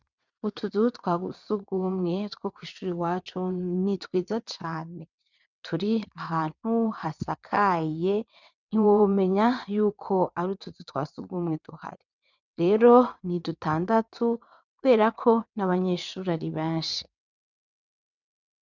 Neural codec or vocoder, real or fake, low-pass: vocoder, 22.05 kHz, 80 mel bands, WaveNeXt; fake; 7.2 kHz